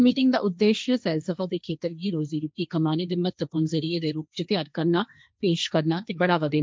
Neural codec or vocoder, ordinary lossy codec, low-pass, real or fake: codec, 16 kHz, 1.1 kbps, Voila-Tokenizer; none; none; fake